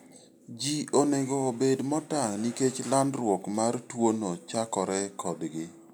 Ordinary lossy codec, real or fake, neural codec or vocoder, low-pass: none; real; none; none